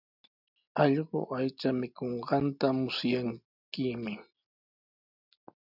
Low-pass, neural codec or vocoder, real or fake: 5.4 kHz; none; real